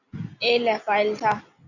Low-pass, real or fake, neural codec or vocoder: 7.2 kHz; real; none